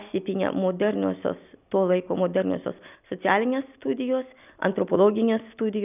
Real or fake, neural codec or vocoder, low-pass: real; none; 3.6 kHz